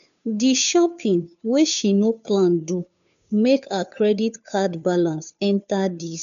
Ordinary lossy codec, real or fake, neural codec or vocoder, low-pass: none; fake; codec, 16 kHz, 2 kbps, FunCodec, trained on Chinese and English, 25 frames a second; 7.2 kHz